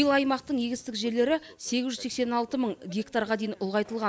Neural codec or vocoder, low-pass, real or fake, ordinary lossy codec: none; none; real; none